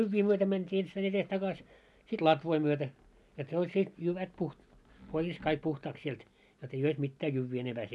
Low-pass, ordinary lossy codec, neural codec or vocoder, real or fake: none; none; none; real